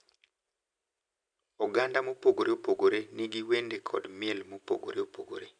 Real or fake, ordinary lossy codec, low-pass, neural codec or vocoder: real; none; 9.9 kHz; none